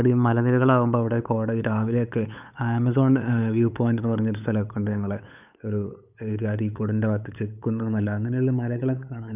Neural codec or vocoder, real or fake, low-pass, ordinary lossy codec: codec, 16 kHz, 8 kbps, FunCodec, trained on LibriTTS, 25 frames a second; fake; 3.6 kHz; none